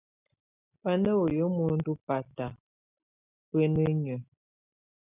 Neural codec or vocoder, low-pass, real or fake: none; 3.6 kHz; real